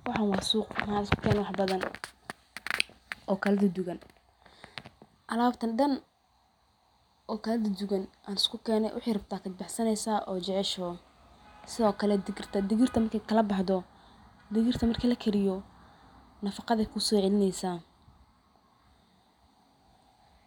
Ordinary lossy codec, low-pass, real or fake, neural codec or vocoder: none; 19.8 kHz; real; none